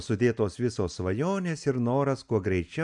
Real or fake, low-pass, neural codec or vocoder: real; 10.8 kHz; none